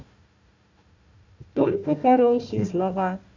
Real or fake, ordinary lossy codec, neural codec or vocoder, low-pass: fake; MP3, 64 kbps; codec, 16 kHz, 1 kbps, FunCodec, trained on Chinese and English, 50 frames a second; 7.2 kHz